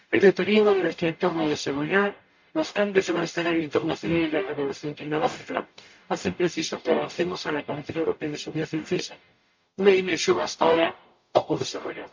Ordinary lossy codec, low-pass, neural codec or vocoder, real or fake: MP3, 48 kbps; 7.2 kHz; codec, 44.1 kHz, 0.9 kbps, DAC; fake